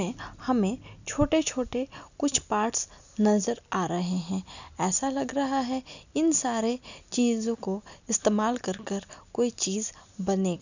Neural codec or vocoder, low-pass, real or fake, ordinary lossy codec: none; 7.2 kHz; real; none